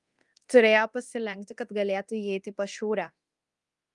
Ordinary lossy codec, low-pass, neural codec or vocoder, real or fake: Opus, 32 kbps; 10.8 kHz; codec, 24 kHz, 0.9 kbps, DualCodec; fake